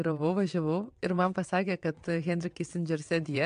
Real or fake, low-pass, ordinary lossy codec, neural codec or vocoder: fake; 9.9 kHz; MP3, 64 kbps; vocoder, 22.05 kHz, 80 mel bands, WaveNeXt